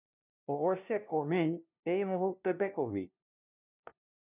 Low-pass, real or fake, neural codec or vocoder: 3.6 kHz; fake; codec, 16 kHz, 0.5 kbps, FunCodec, trained on LibriTTS, 25 frames a second